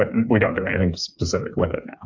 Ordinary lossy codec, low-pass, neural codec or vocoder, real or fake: AAC, 48 kbps; 7.2 kHz; codec, 16 kHz, 4 kbps, FreqCodec, smaller model; fake